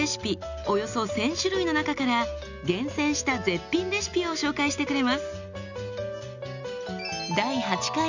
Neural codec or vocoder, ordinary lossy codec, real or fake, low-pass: none; none; real; 7.2 kHz